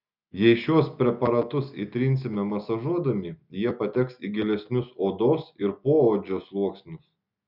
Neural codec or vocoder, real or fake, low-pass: none; real; 5.4 kHz